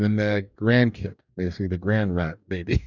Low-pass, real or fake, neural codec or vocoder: 7.2 kHz; fake; codec, 44.1 kHz, 2.6 kbps, DAC